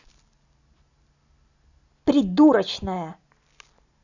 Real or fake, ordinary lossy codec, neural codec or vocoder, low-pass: real; none; none; 7.2 kHz